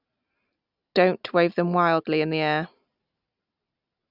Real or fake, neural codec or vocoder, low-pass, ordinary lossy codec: real; none; 5.4 kHz; none